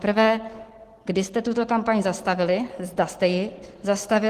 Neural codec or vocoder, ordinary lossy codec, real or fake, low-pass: none; Opus, 16 kbps; real; 14.4 kHz